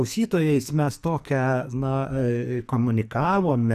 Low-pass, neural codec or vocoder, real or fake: 14.4 kHz; codec, 44.1 kHz, 2.6 kbps, SNAC; fake